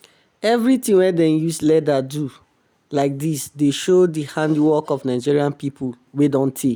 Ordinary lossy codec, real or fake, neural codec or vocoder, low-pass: none; real; none; none